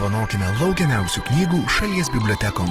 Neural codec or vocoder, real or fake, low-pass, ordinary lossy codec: none; real; 14.4 kHz; Opus, 16 kbps